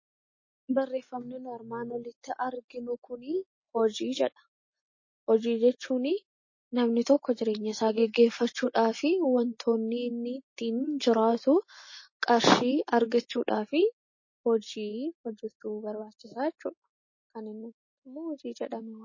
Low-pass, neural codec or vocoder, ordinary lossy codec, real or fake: 7.2 kHz; none; MP3, 32 kbps; real